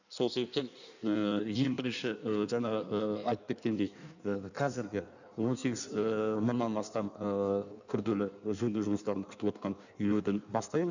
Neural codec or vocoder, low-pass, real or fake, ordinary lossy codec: codec, 16 kHz in and 24 kHz out, 1.1 kbps, FireRedTTS-2 codec; 7.2 kHz; fake; none